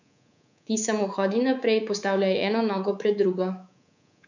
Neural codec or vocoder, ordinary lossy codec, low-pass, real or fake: codec, 24 kHz, 3.1 kbps, DualCodec; none; 7.2 kHz; fake